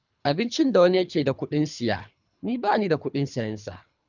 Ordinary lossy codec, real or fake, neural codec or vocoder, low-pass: none; fake; codec, 24 kHz, 3 kbps, HILCodec; 7.2 kHz